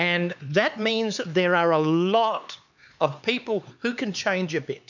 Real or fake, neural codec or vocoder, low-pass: fake; codec, 16 kHz, 4 kbps, X-Codec, HuBERT features, trained on LibriSpeech; 7.2 kHz